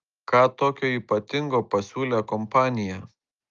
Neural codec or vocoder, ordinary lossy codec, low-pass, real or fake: none; Opus, 32 kbps; 7.2 kHz; real